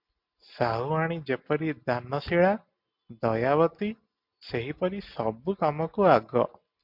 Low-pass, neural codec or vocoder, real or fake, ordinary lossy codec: 5.4 kHz; none; real; MP3, 48 kbps